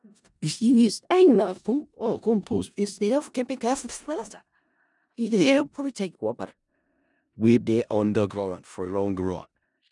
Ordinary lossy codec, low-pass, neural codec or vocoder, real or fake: none; 10.8 kHz; codec, 16 kHz in and 24 kHz out, 0.4 kbps, LongCat-Audio-Codec, four codebook decoder; fake